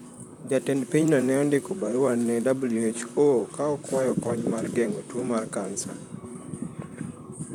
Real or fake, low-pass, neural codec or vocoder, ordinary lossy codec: fake; 19.8 kHz; vocoder, 44.1 kHz, 128 mel bands, Pupu-Vocoder; none